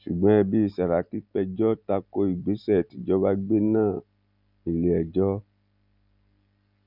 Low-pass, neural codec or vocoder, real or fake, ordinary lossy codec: 5.4 kHz; none; real; none